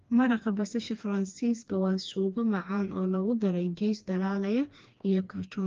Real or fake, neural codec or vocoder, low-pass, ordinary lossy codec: fake; codec, 16 kHz, 2 kbps, FreqCodec, smaller model; 7.2 kHz; Opus, 32 kbps